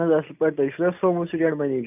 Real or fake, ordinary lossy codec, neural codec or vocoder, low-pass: real; none; none; 3.6 kHz